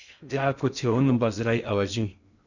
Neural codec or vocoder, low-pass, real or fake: codec, 16 kHz in and 24 kHz out, 0.6 kbps, FocalCodec, streaming, 2048 codes; 7.2 kHz; fake